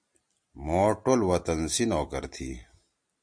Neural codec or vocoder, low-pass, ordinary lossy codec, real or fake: none; 9.9 kHz; MP3, 64 kbps; real